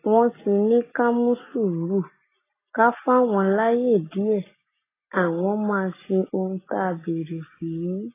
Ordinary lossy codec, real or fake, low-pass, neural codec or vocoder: AAC, 16 kbps; real; 3.6 kHz; none